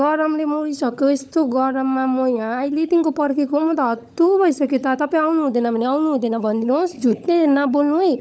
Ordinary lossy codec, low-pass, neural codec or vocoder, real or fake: none; none; codec, 16 kHz, 16 kbps, FunCodec, trained on LibriTTS, 50 frames a second; fake